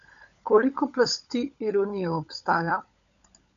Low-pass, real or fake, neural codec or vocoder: 7.2 kHz; fake; codec, 16 kHz, 16 kbps, FunCodec, trained on LibriTTS, 50 frames a second